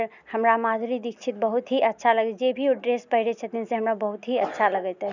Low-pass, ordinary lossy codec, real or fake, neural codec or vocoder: 7.2 kHz; none; real; none